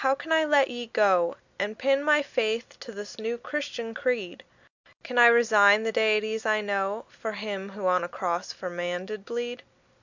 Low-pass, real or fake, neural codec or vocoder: 7.2 kHz; real; none